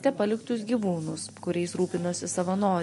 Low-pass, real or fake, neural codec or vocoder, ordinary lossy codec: 14.4 kHz; real; none; MP3, 48 kbps